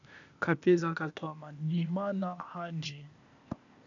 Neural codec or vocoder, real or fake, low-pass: codec, 16 kHz, 0.8 kbps, ZipCodec; fake; 7.2 kHz